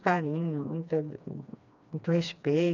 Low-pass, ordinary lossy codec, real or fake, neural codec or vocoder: 7.2 kHz; none; fake; codec, 16 kHz, 2 kbps, FreqCodec, smaller model